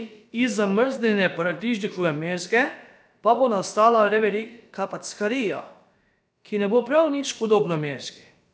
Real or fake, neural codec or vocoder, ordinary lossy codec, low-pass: fake; codec, 16 kHz, about 1 kbps, DyCAST, with the encoder's durations; none; none